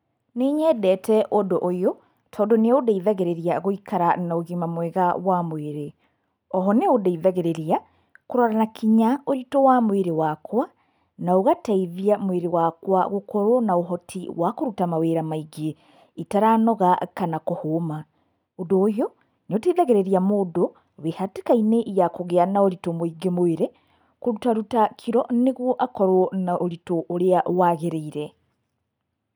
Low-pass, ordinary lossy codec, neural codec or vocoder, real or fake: 19.8 kHz; none; none; real